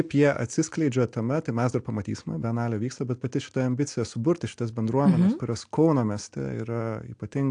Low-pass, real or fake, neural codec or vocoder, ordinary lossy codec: 9.9 kHz; real; none; AAC, 64 kbps